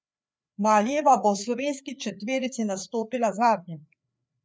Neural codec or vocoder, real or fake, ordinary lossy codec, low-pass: codec, 16 kHz, 4 kbps, FreqCodec, larger model; fake; none; none